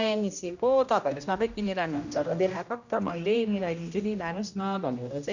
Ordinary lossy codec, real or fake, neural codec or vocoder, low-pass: none; fake; codec, 16 kHz, 1 kbps, X-Codec, HuBERT features, trained on general audio; 7.2 kHz